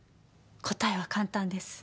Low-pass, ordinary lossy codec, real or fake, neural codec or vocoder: none; none; real; none